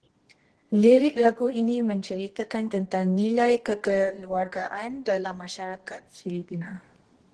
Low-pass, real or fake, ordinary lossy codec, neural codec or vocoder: 10.8 kHz; fake; Opus, 16 kbps; codec, 24 kHz, 0.9 kbps, WavTokenizer, medium music audio release